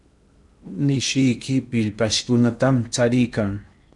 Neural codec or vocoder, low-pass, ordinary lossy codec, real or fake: codec, 16 kHz in and 24 kHz out, 0.8 kbps, FocalCodec, streaming, 65536 codes; 10.8 kHz; MP3, 96 kbps; fake